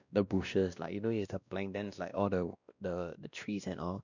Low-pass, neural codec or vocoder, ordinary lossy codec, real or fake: 7.2 kHz; codec, 16 kHz, 1 kbps, X-Codec, WavLM features, trained on Multilingual LibriSpeech; none; fake